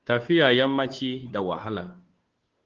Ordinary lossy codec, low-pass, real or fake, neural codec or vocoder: Opus, 16 kbps; 7.2 kHz; fake; codec, 16 kHz, 2 kbps, FunCodec, trained on Chinese and English, 25 frames a second